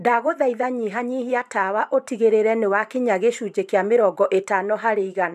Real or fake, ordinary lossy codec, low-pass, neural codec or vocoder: fake; none; 14.4 kHz; vocoder, 44.1 kHz, 128 mel bands every 512 samples, BigVGAN v2